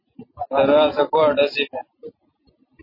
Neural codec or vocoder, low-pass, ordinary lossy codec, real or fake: none; 5.4 kHz; MP3, 24 kbps; real